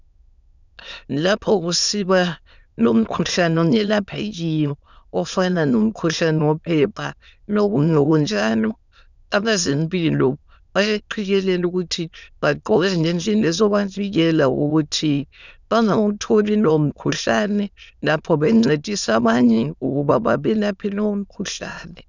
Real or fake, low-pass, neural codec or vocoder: fake; 7.2 kHz; autoencoder, 22.05 kHz, a latent of 192 numbers a frame, VITS, trained on many speakers